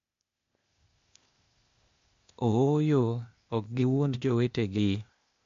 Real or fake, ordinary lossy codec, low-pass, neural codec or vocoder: fake; MP3, 48 kbps; 7.2 kHz; codec, 16 kHz, 0.8 kbps, ZipCodec